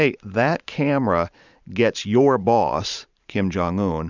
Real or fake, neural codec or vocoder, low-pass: fake; vocoder, 44.1 kHz, 128 mel bands every 512 samples, BigVGAN v2; 7.2 kHz